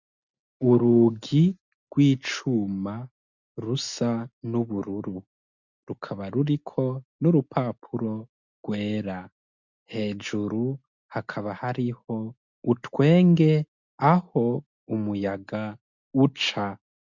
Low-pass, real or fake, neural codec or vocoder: 7.2 kHz; real; none